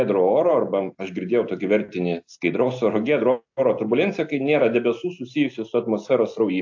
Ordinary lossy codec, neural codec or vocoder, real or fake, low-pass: AAC, 48 kbps; none; real; 7.2 kHz